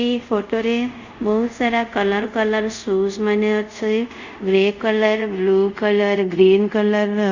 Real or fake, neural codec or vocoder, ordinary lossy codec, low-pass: fake; codec, 24 kHz, 0.5 kbps, DualCodec; Opus, 64 kbps; 7.2 kHz